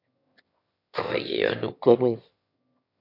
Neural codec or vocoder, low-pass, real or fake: autoencoder, 22.05 kHz, a latent of 192 numbers a frame, VITS, trained on one speaker; 5.4 kHz; fake